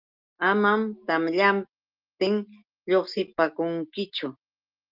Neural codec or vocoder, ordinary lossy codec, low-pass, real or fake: none; Opus, 32 kbps; 5.4 kHz; real